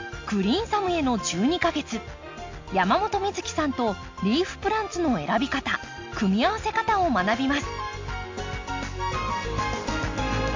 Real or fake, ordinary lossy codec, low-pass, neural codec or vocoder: real; MP3, 48 kbps; 7.2 kHz; none